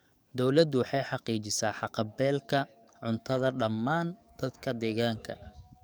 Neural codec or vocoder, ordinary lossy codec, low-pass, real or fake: codec, 44.1 kHz, 7.8 kbps, DAC; none; none; fake